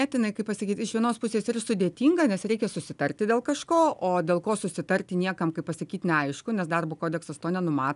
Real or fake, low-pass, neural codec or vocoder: real; 10.8 kHz; none